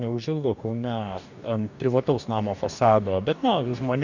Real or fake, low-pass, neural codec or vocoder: fake; 7.2 kHz; codec, 44.1 kHz, 2.6 kbps, DAC